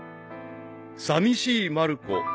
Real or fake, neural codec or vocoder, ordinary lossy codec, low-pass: real; none; none; none